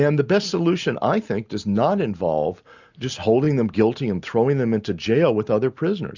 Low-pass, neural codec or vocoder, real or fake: 7.2 kHz; none; real